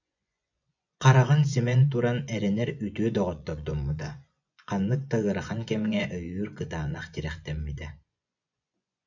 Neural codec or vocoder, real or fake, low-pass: vocoder, 24 kHz, 100 mel bands, Vocos; fake; 7.2 kHz